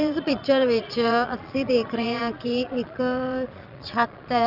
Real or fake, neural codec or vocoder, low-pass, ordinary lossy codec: fake; vocoder, 22.05 kHz, 80 mel bands, Vocos; 5.4 kHz; none